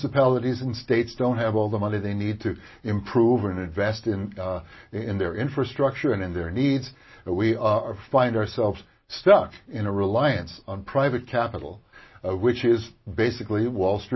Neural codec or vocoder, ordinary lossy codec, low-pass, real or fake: none; MP3, 24 kbps; 7.2 kHz; real